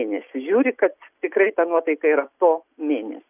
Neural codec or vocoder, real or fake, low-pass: none; real; 3.6 kHz